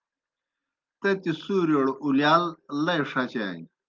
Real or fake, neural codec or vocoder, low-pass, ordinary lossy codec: real; none; 7.2 kHz; Opus, 24 kbps